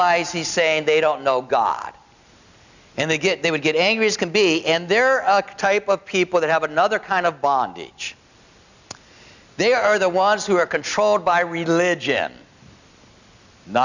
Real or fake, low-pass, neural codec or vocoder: real; 7.2 kHz; none